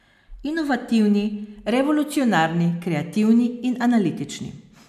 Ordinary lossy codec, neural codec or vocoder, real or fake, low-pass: none; none; real; 14.4 kHz